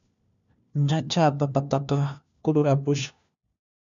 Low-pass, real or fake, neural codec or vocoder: 7.2 kHz; fake; codec, 16 kHz, 1 kbps, FunCodec, trained on LibriTTS, 50 frames a second